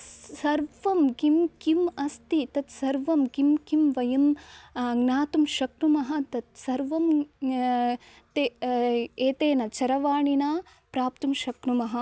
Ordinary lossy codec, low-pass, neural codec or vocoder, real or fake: none; none; none; real